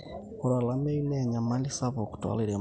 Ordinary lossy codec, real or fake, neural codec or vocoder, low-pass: none; real; none; none